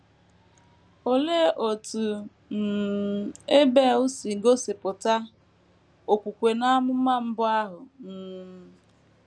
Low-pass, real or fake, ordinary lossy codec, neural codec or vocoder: none; real; none; none